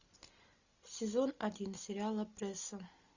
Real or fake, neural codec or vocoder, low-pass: real; none; 7.2 kHz